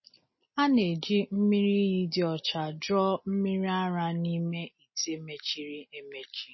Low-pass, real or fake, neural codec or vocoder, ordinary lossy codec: 7.2 kHz; real; none; MP3, 24 kbps